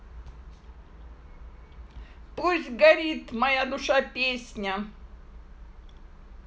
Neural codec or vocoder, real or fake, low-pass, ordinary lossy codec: none; real; none; none